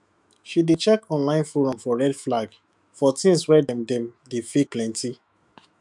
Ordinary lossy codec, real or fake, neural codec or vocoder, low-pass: none; fake; autoencoder, 48 kHz, 128 numbers a frame, DAC-VAE, trained on Japanese speech; 10.8 kHz